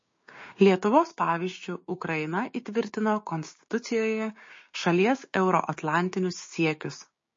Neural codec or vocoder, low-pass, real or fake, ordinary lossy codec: codec, 16 kHz, 6 kbps, DAC; 7.2 kHz; fake; MP3, 32 kbps